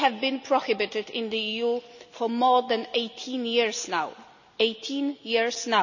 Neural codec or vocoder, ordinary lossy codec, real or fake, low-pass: none; none; real; 7.2 kHz